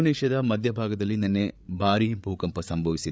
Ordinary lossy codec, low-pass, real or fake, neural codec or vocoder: none; none; fake; codec, 16 kHz, 8 kbps, FreqCodec, larger model